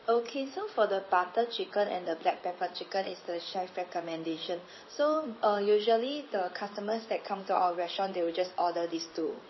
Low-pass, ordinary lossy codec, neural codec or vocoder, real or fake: 7.2 kHz; MP3, 24 kbps; codec, 16 kHz in and 24 kHz out, 1 kbps, XY-Tokenizer; fake